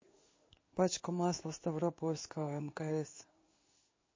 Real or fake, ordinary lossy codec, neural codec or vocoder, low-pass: fake; MP3, 32 kbps; codec, 16 kHz in and 24 kHz out, 1 kbps, XY-Tokenizer; 7.2 kHz